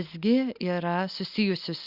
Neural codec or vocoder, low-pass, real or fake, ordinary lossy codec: none; 5.4 kHz; real; Opus, 64 kbps